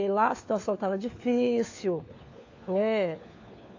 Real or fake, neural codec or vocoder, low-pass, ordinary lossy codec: fake; codec, 16 kHz, 4 kbps, FunCodec, trained on LibriTTS, 50 frames a second; 7.2 kHz; AAC, 48 kbps